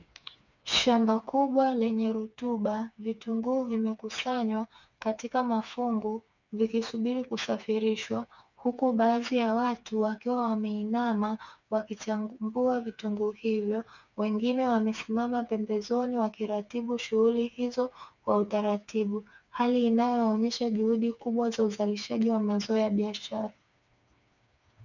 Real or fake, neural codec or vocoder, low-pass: fake; codec, 16 kHz, 4 kbps, FreqCodec, smaller model; 7.2 kHz